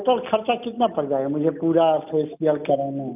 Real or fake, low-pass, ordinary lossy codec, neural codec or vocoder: real; 3.6 kHz; none; none